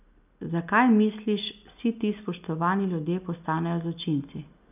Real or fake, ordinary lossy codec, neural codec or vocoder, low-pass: real; none; none; 3.6 kHz